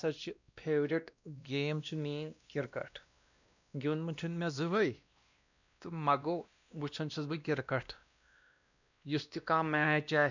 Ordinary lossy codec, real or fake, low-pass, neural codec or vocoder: none; fake; 7.2 kHz; codec, 16 kHz, 1 kbps, X-Codec, WavLM features, trained on Multilingual LibriSpeech